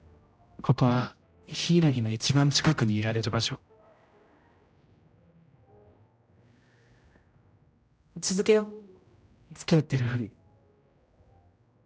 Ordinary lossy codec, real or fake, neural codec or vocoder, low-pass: none; fake; codec, 16 kHz, 0.5 kbps, X-Codec, HuBERT features, trained on general audio; none